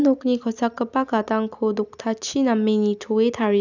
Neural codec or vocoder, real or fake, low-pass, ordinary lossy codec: none; real; 7.2 kHz; none